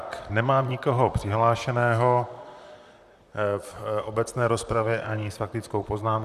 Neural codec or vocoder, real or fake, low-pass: vocoder, 44.1 kHz, 128 mel bands, Pupu-Vocoder; fake; 14.4 kHz